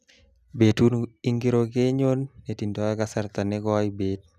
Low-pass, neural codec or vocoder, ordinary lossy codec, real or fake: 14.4 kHz; none; none; real